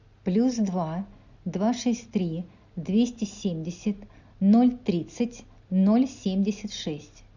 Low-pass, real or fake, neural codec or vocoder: 7.2 kHz; real; none